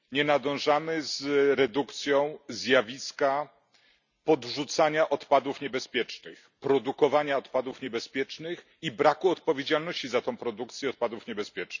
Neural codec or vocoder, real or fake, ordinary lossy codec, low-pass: none; real; none; 7.2 kHz